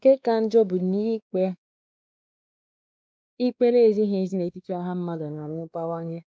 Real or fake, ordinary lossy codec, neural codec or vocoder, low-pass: fake; none; codec, 16 kHz, 2 kbps, X-Codec, WavLM features, trained on Multilingual LibriSpeech; none